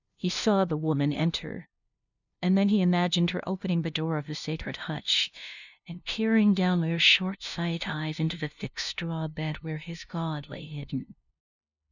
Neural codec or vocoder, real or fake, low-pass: codec, 16 kHz, 0.5 kbps, FunCodec, trained on LibriTTS, 25 frames a second; fake; 7.2 kHz